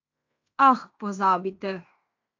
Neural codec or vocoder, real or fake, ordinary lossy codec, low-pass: codec, 16 kHz in and 24 kHz out, 0.9 kbps, LongCat-Audio-Codec, fine tuned four codebook decoder; fake; AAC, 48 kbps; 7.2 kHz